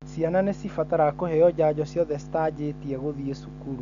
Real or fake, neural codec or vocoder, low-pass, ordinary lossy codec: real; none; 7.2 kHz; none